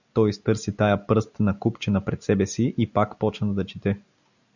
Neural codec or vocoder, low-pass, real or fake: none; 7.2 kHz; real